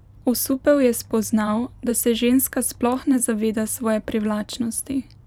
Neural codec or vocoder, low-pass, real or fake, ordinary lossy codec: vocoder, 44.1 kHz, 128 mel bands every 512 samples, BigVGAN v2; 19.8 kHz; fake; none